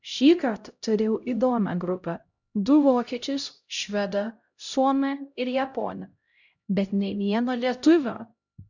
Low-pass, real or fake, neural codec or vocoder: 7.2 kHz; fake; codec, 16 kHz, 0.5 kbps, X-Codec, HuBERT features, trained on LibriSpeech